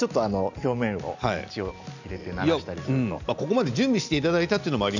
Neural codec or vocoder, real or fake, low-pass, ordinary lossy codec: none; real; 7.2 kHz; none